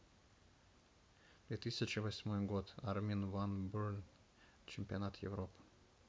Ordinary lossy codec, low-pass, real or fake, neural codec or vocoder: none; none; real; none